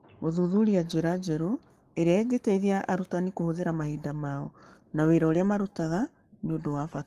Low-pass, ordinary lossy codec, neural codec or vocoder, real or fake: 14.4 kHz; Opus, 24 kbps; codec, 44.1 kHz, 7.8 kbps, Pupu-Codec; fake